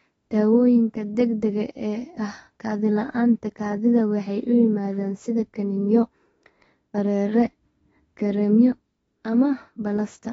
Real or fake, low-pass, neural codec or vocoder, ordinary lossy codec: fake; 19.8 kHz; autoencoder, 48 kHz, 32 numbers a frame, DAC-VAE, trained on Japanese speech; AAC, 24 kbps